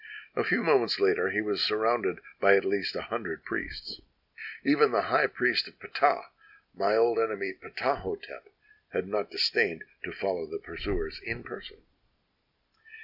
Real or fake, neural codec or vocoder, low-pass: real; none; 5.4 kHz